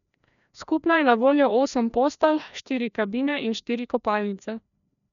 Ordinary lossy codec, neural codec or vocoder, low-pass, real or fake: none; codec, 16 kHz, 1 kbps, FreqCodec, larger model; 7.2 kHz; fake